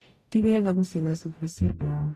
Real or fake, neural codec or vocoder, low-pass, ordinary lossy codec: fake; codec, 44.1 kHz, 0.9 kbps, DAC; 14.4 kHz; AAC, 48 kbps